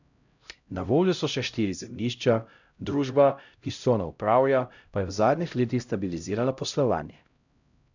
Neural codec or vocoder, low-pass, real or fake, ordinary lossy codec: codec, 16 kHz, 0.5 kbps, X-Codec, HuBERT features, trained on LibriSpeech; 7.2 kHz; fake; none